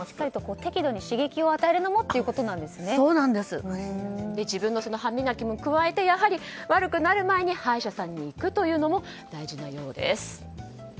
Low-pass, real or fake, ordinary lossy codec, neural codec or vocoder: none; real; none; none